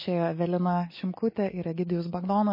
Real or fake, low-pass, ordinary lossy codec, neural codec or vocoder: real; 5.4 kHz; MP3, 24 kbps; none